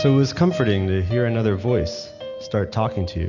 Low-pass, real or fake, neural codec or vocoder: 7.2 kHz; real; none